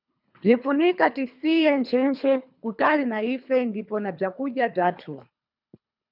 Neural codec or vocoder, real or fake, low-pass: codec, 24 kHz, 3 kbps, HILCodec; fake; 5.4 kHz